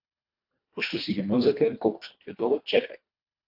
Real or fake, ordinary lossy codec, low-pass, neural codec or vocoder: fake; AAC, 48 kbps; 5.4 kHz; codec, 24 kHz, 1.5 kbps, HILCodec